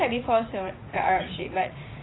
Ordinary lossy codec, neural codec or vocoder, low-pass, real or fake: AAC, 16 kbps; vocoder, 44.1 kHz, 80 mel bands, Vocos; 7.2 kHz; fake